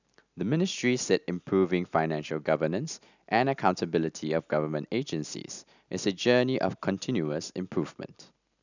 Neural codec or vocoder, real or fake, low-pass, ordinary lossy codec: none; real; 7.2 kHz; none